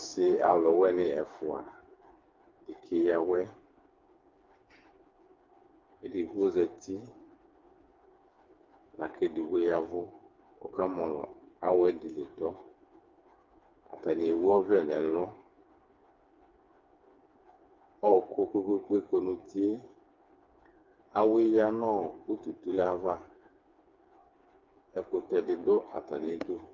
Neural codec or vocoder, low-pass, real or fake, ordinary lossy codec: codec, 16 kHz, 4 kbps, FreqCodec, smaller model; 7.2 kHz; fake; Opus, 24 kbps